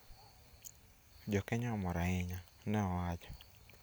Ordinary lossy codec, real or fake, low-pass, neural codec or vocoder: none; real; none; none